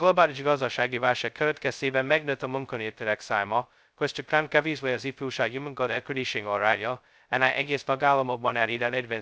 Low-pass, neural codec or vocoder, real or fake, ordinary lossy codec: none; codec, 16 kHz, 0.2 kbps, FocalCodec; fake; none